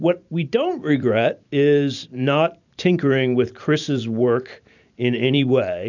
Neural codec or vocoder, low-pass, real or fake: vocoder, 44.1 kHz, 128 mel bands every 512 samples, BigVGAN v2; 7.2 kHz; fake